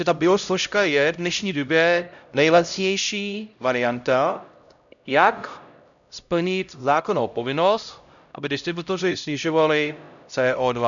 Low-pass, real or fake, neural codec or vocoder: 7.2 kHz; fake; codec, 16 kHz, 0.5 kbps, X-Codec, HuBERT features, trained on LibriSpeech